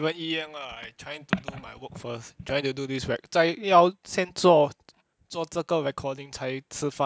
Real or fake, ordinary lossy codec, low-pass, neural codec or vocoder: real; none; none; none